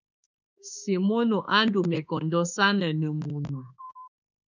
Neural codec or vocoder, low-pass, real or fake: autoencoder, 48 kHz, 32 numbers a frame, DAC-VAE, trained on Japanese speech; 7.2 kHz; fake